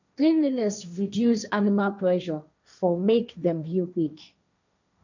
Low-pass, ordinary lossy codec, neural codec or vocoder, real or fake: 7.2 kHz; none; codec, 16 kHz, 1.1 kbps, Voila-Tokenizer; fake